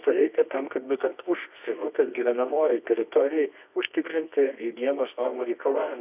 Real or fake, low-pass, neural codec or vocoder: fake; 3.6 kHz; codec, 24 kHz, 0.9 kbps, WavTokenizer, medium music audio release